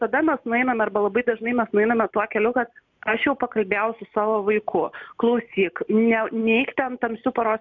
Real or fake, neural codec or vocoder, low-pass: real; none; 7.2 kHz